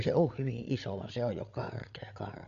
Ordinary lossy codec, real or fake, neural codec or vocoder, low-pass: none; fake; codec, 16 kHz, 8 kbps, FreqCodec, larger model; 7.2 kHz